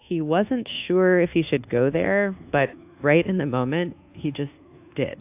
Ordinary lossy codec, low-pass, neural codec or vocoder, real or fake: AAC, 32 kbps; 3.6 kHz; codec, 24 kHz, 1.2 kbps, DualCodec; fake